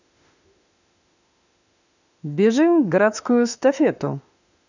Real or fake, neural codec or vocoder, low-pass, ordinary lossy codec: fake; autoencoder, 48 kHz, 32 numbers a frame, DAC-VAE, trained on Japanese speech; 7.2 kHz; none